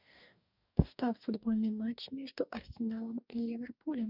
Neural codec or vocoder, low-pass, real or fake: codec, 44.1 kHz, 2.6 kbps, DAC; 5.4 kHz; fake